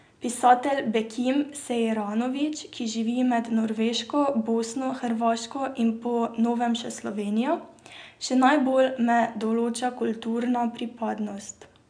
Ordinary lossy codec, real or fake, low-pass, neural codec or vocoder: none; real; 9.9 kHz; none